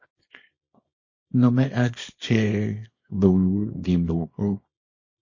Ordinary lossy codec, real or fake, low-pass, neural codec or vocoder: MP3, 32 kbps; fake; 7.2 kHz; codec, 24 kHz, 0.9 kbps, WavTokenizer, small release